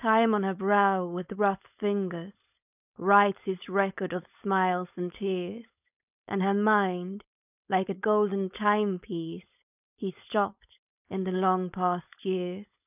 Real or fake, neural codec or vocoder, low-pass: fake; codec, 16 kHz, 4.8 kbps, FACodec; 3.6 kHz